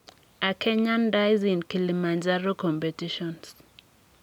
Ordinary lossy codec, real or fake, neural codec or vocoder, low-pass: none; real; none; 19.8 kHz